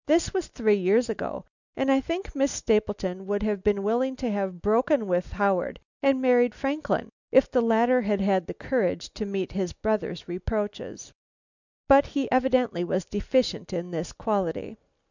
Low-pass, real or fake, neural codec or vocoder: 7.2 kHz; real; none